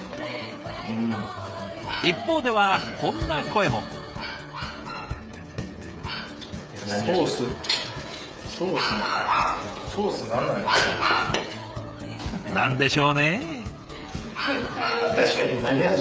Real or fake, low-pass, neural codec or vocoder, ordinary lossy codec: fake; none; codec, 16 kHz, 8 kbps, FreqCodec, smaller model; none